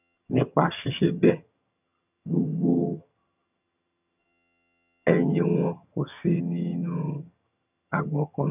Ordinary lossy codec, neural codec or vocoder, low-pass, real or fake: none; vocoder, 22.05 kHz, 80 mel bands, HiFi-GAN; 3.6 kHz; fake